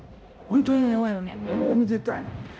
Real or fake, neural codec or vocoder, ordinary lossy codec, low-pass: fake; codec, 16 kHz, 0.5 kbps, X-Codec, HuBERT features, trained on balanced general audio; none; none